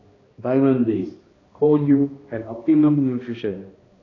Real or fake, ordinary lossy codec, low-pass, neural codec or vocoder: fake; AAC, 32 kbps; 7.2 kHz; codec, 16 kHz, 1 kbps, X-Codec, HuBERT features, trained on balanced general audio